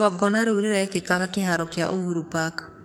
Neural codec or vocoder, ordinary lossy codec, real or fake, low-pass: codec, 44.1 kHz, 2.6 kbps, SNAC; none; fake; none